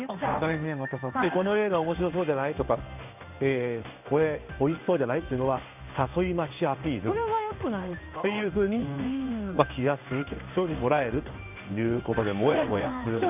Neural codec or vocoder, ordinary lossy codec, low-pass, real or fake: codec, 16 kHz in and 24 kHz out, 1 kbps, XY-Tokenizer; none; 3.6 kHz; fake